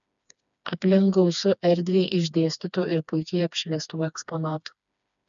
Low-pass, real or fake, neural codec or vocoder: 7.2 kHz; fake; codec, 16 kHz, 2 kbps, FreqCodec, smaller model